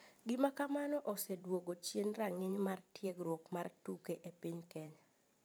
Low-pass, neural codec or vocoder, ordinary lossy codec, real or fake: none; vocoder, 44.1 kHz, 128 mel bands every 512 samples, BigVGAN v2; none; fake